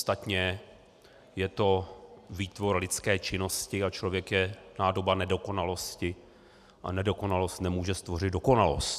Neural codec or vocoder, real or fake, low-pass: none; real; 14.4 kHz